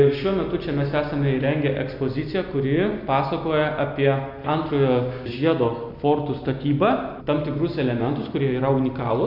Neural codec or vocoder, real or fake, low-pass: none; real; 5.4 kHz